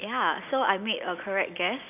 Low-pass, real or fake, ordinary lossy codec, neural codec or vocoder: 3.6 kHz; real; none; none